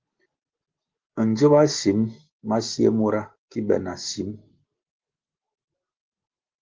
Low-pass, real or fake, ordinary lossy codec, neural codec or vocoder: 7.2 kHz; real; Opus, 16 kbps; none